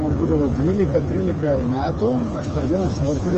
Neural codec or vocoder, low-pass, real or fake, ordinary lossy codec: codec, 16 kHz, 4 kbps, FreqCodec, smaller model; 7.2 kHz; fake; Opus, 24 kbps